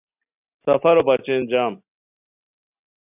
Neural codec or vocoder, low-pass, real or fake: none; 3.6 kHz; real